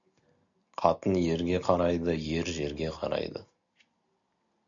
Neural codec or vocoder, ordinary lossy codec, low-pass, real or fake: none; MP3, 96 kbps; 7.2 kHz; real